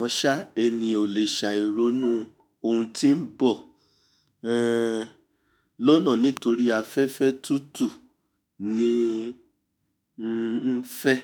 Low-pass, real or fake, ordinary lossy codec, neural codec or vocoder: none; fake; none; autoencoder, 48 kHz, 32 numbers a frame, DAC-VAE, trained on Japanese speech